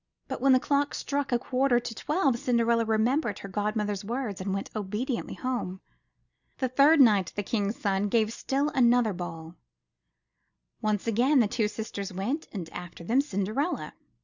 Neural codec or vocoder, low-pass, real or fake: none; 7.2 kHz; real